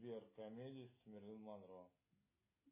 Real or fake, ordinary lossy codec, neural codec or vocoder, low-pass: real; MP3, 16 kbps; none; 3.6 kHz